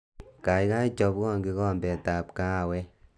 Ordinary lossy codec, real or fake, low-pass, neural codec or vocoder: none; real; none; none